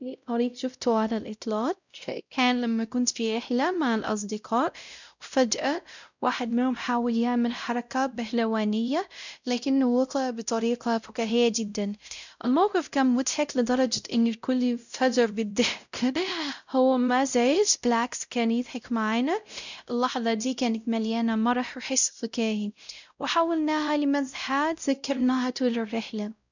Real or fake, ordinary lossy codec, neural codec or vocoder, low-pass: fake; none; codec, 16 kHz, 0.5 kbps, X-Codec, WavLM features, trained on Multilingual LibriSpeech; 7.2 kHz